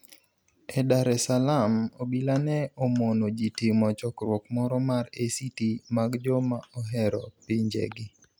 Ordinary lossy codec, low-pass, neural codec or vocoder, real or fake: none; none; none; real